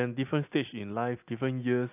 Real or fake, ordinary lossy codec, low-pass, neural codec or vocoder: real; AAC, 24 kbps; 3.6 kHz; none